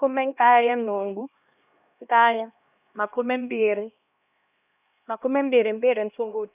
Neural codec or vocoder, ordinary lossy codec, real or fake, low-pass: codec, 16 kHz, 1 kbps, X-Codec, HuBERT features, trained on LibriSpeech; none; fake; 3.6 kHz